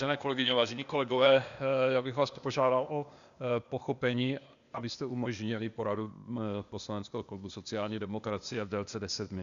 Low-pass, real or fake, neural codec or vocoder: 7.2 kHz; fake; codec, 16 kHz, 0.8 kbps, ZipCodec